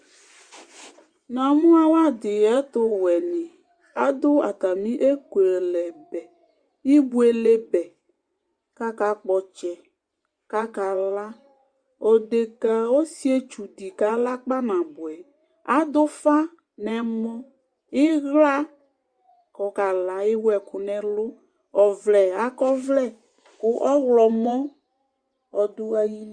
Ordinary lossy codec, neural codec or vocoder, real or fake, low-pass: Opus, 64 kbps; vocoder, 24 kHz, 100 mel bands, Vocos; fake; 9.9 kHz